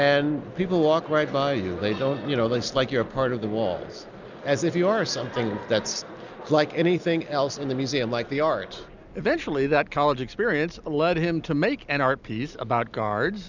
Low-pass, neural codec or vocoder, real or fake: 7.2 kHz; none; real